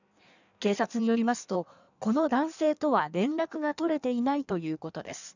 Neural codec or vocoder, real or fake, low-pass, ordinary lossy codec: codec, 16 kHz in and 24 kHz out, 1.1 kbps, FireRedTTS-2 codec; fake; 7.2 kHz; none